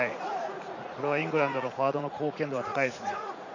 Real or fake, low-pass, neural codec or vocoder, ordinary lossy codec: fake; 7.2 kHz; vocoder, 44.1 kHz, 80 mel bands, Vocos; none